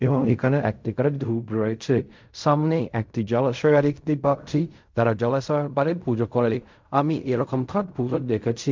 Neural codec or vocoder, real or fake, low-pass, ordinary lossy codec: codec, 16 kHz in and 24 kHz out, 0.4 kbps, LongCat-Audio-Codec, fine tuned four codebook decoder; fake; 7.2 kHz; MP3, 64 kbps